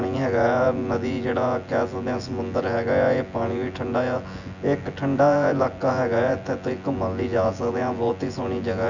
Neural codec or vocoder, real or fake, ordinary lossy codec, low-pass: vocoder, 24 kHz, 100 mel bands, Vocos; fake; none; 7.2 kHz